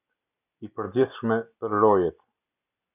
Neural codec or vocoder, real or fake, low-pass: none; real; 3.6 kHz